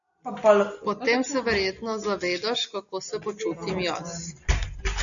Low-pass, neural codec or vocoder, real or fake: 7.2 kHz; none; real